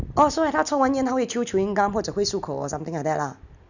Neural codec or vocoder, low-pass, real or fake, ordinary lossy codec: none; 7.2 kHz; real; none